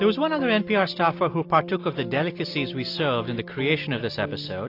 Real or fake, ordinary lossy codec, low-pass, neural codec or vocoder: real; AAC, 32 kbps; 5.4 kHz; none